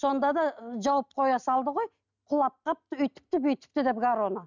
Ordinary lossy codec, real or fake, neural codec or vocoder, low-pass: none; real; none; 7.2 kHz